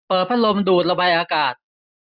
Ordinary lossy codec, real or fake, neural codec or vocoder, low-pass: none; real; none; 5.4 kHz